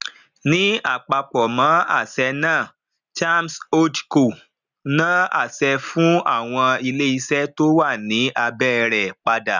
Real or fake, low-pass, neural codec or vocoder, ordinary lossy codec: real; 7.2 kHz; none; none